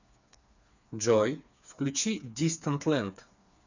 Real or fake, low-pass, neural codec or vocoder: fake; 7.2 kHz; codec, 16 kHz, 4 kbps, FreqCodec, smaller model